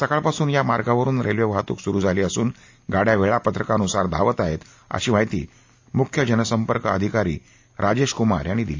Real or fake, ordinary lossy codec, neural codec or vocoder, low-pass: real; AAC, 48 kbps; none; 7.2 kHz